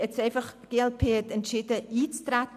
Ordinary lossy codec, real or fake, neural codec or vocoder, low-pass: none; real; none; 14.4 kHz